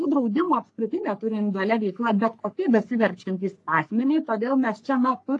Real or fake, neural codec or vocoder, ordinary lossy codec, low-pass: fake; codec, 44.1 kHz, 3.4 kbps, Pupu-Codec; AAC, 48 kbps; 10.8 kHz